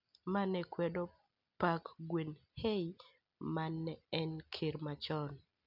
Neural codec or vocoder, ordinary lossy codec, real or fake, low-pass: none; none; real; 5.4 kHz